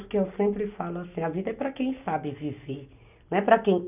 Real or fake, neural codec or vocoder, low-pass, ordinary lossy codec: real; none; 3.6 kHz; none